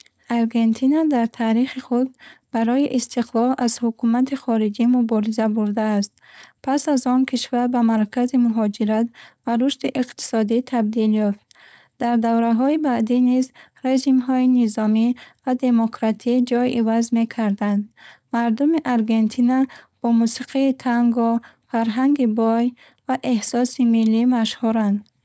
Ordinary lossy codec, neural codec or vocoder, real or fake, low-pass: none; codec, 16 kHz, 4.8 kbps, FACodec; fake; none